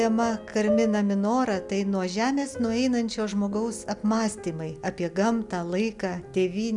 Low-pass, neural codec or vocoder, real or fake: 10.8 kHz; none; real